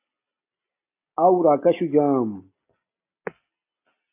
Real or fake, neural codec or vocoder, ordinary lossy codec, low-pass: real; none; AAC, 24 kbps; 3.6 kHz